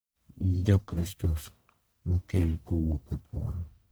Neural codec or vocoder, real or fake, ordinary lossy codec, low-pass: codec, 44.1 kHz, 1.7 kbps, Pupu-Codec; fake; none; none